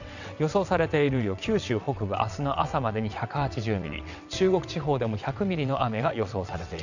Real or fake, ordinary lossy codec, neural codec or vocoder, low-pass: fake; none; vocoder, 22.05 kHz, 80 mel bands, WaveNeXt; 7.2 kHz